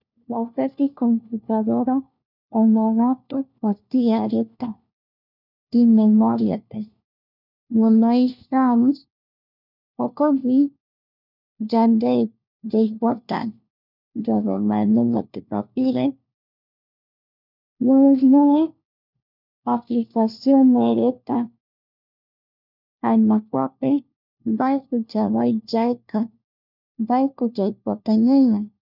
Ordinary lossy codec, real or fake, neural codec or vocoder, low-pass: none; fake; codec, 16 kHz, 1 kbps, FunCodec, trained on LibriTTS, 50 frames a second; 5.4 kHz